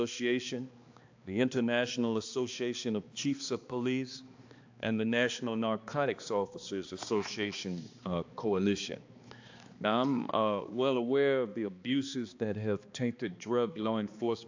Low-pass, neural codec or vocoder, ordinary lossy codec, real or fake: 7.2 kHz; codec, 16 kHz, 2 kbps, X-Codec, HuBERT features, trained on balanced general audio; AAC, 48 kbps; fake